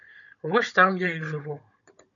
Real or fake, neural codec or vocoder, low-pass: fake; codec, 16 kHz, 16 kbps, FunCodec, trained on Chinese and English, 50 frames a second; 7.2 kHz